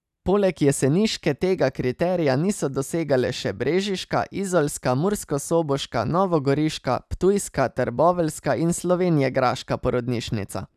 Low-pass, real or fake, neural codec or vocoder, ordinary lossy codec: 14.4 kHz; real; none; none